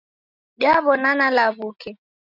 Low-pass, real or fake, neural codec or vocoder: 5.4 kHz; real; none